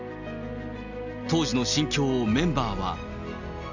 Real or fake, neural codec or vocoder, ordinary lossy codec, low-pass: real; none; none; 7.2 kHz